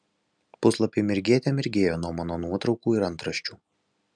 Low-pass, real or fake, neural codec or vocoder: 9.9 kHz; real; none